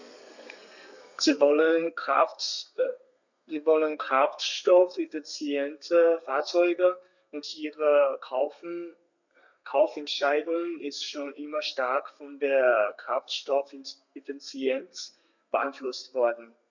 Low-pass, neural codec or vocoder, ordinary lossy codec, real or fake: 7.2 kHz; codec, 32 kHz, 1.9 kbps, SNAC; none; fake